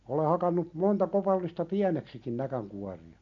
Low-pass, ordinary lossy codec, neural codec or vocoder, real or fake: 7.2 kHz; MP3, 64 kbps; none; real